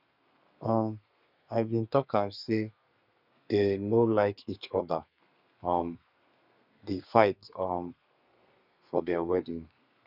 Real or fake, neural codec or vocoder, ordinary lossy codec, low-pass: fake; codec, 32 kHz, 1.9 kbps, SNAC; none; 5.4 kHz